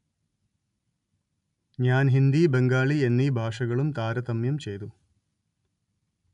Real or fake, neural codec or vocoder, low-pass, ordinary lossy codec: real; none; 10.8 kHz; none